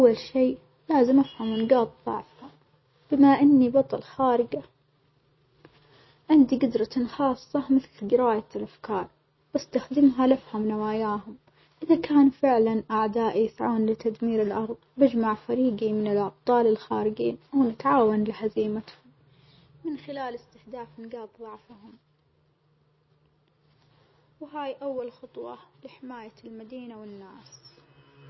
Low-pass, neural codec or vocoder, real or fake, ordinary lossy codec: 7.2 kHz; none; real; MP3, 24 kbps